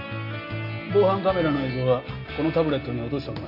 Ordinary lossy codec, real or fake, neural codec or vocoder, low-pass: none; real; none; 5.4 kHz